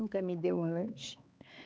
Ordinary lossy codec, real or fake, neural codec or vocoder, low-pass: none; fake; codec, 16 kHz, 4 kbps, X-Codec, HuBERT features, trained on LibriSpeech; none